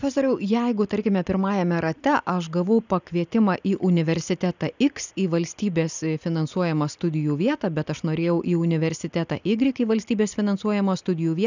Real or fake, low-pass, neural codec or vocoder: real; 7.2 kHz; none